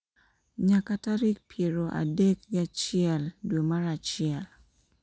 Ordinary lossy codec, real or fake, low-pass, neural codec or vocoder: none; real; none; none